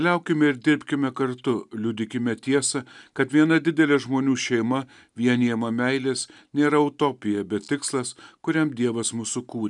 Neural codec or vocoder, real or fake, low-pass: none; real; 10.8 kHz